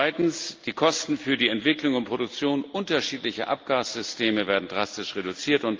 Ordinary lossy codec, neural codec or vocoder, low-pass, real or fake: Opus, 24 kbps; none; 7.2 kHz; real